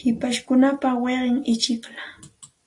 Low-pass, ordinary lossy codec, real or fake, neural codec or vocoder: 10.8 kHz; AAC, 48 kbps; real; none